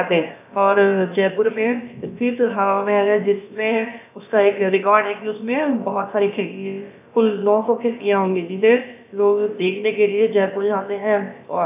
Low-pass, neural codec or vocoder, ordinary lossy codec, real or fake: 3.6 kHz; codec, 16 kHz, about 1 kbps, DyCAST, with the encoder's durations; none; fake